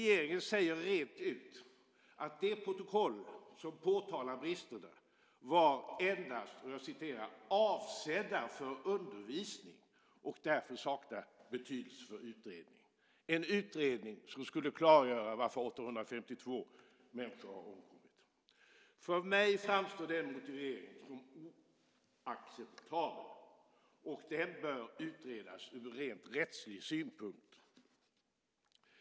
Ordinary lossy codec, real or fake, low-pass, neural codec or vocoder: none; real; none; none